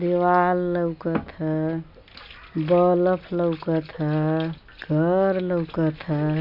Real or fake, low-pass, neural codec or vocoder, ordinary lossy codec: real; 5.4 kHz; none; MP3, 48 kbps